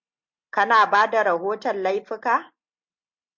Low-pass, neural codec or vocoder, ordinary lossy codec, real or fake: 7.2 kHz; none; MP3, 64 kbps; real